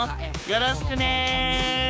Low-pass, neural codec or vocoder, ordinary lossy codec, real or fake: none; codec, 16 kHz, 6 kbps, DAC; none; fake